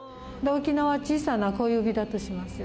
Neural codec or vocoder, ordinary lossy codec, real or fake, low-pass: none; none; real; none